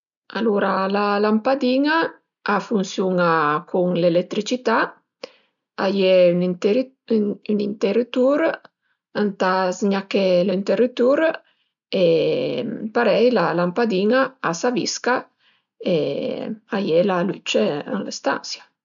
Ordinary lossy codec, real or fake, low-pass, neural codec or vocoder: none; real; 7.2 kHz; none